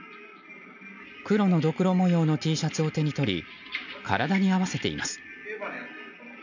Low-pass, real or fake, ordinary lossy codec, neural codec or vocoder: 7.2 kHz; real; none; none